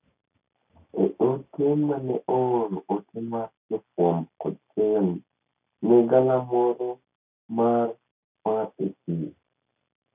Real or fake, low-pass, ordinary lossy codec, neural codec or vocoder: real; 3.6 kHz; none; none